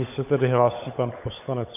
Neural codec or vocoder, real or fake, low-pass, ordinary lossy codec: codec, 16 kHz, 16 kbps, FunCodec, trained on LibriTTS, 50 frames a second; fake; 3.6 kHz; AAC, 32 kbps